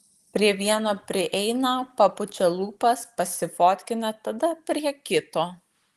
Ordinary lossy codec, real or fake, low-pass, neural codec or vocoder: Opus, 24 kbps; real; 14.4 kHz; none